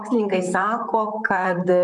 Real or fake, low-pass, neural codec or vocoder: fake; 10.8 kHz; vocoder, 44.1 kHz, 128 mel bands, Pupu-Vocoder